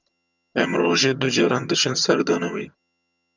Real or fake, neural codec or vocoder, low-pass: fake; vocoder, 22.05 kHz, 80 mel bands, HiFi-GAN; 7.2 kHz